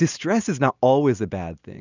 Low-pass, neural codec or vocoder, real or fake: 7.2 kHz; none; real